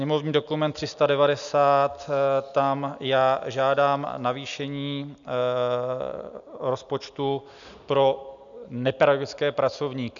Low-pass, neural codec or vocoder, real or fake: 7.2 kHz; none; real